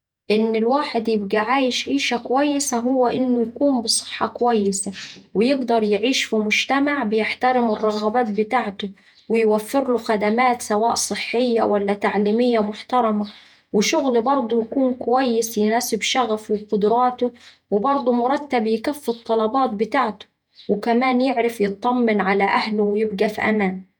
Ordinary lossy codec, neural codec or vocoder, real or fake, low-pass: none; vocoder, 48 kHz, 128 mel bands, Vocos; fake; 19.8 kHz